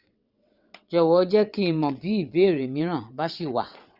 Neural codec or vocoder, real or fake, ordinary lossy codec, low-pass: vocoder, 22.05 kHz, 80 mel bands, WaveNeXt; fake; Opus, 64 kbps; 5.4 kHz